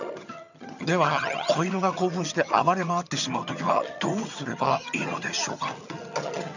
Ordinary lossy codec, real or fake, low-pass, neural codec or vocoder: none; fake; 7.2 kHz; vocoder, 22.05 kHz, 80 mel bands, HiFi-GAN